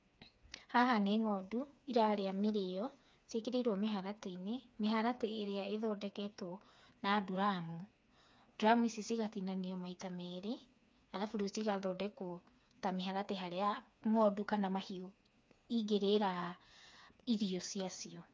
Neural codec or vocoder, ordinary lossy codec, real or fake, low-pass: codec, 16 kHz, 4 kbps, FreqCodec, smaller model; none; fake; none